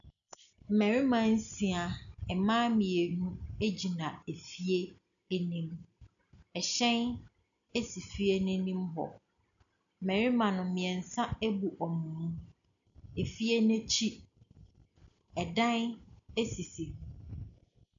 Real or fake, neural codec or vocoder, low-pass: real; none; 7.2 kHz